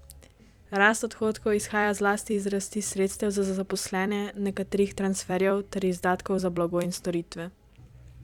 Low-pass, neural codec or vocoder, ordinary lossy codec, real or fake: 19.8 kHz; vocoder, 44.1 kHz, 128 mel bands every 256 samples, BigVGAN v2; none; fake